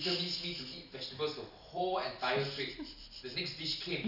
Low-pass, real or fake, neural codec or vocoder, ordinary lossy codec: 5.4 kHz; real; none; none